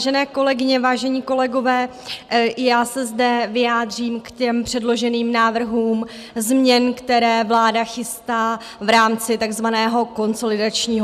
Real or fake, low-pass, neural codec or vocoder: real; 14.4 kHz; none